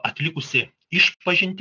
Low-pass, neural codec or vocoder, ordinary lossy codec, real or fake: 7.2 kHz; none; AAC, 48 kbps; real